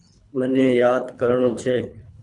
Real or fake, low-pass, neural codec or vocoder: fake; 10.8 kHz; codec, 24 kHz, 3 kbps, HILCodec